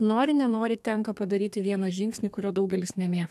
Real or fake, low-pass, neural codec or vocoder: fake; 14.4 kHz; codec, 32 kHz, 1.9 kbps, SNAC